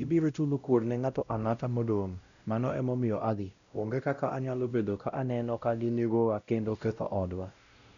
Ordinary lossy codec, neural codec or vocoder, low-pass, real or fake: none; codec, 16 kHz, 0.5 kbps, X-Codec, WavLM features, trained on Multilingual LibriSpeech; 7.2 kHz; fake